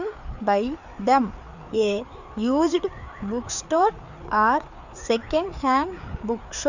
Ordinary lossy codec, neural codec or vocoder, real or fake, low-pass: none; codec, 16 kHz, 4 kbps, FreqCodec, larger model; fake; 7.2 kHz